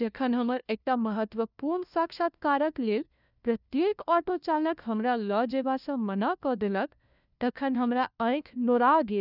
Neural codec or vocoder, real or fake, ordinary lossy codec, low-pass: codec, 16 kHz, 1 kbps, FunCodec, trained on LibriTTS, 50 frames a second; fake; none; 5.4 kHz